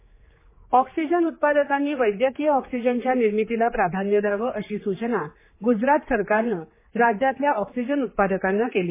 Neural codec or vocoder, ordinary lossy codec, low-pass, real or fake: codec, 16 kHz, 4 kbps, X-Codec, HuBERT features, trained on general audio; MP3, 16 kbps; 3.6 kHz; fake